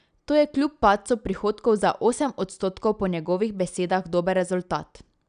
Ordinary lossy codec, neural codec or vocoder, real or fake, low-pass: none; none; real; 9.9 kHz